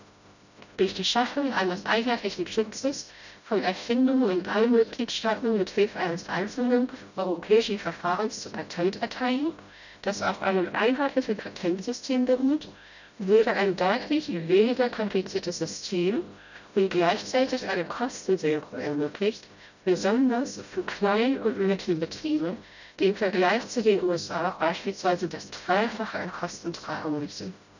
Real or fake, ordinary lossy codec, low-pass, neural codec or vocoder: fake; none; 7.2 kHz; codec, 16 kHz, 0.5 kbps, FreqCodec, smaller model